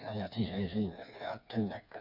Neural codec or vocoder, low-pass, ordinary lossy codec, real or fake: codec, 16 kHz, 4 kbps, FreqCodec, smaller model; 5.4 kHz; none; fake